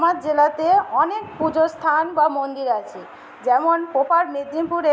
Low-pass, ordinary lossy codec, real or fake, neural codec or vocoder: none; none; real; none